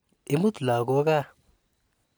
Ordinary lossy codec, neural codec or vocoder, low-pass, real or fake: none; none; none; real